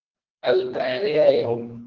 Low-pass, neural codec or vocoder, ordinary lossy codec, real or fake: 7.2 kHz; codec, 24 kHz, 1.5 kbps, HILCodec; Opus, 16 kbps; fake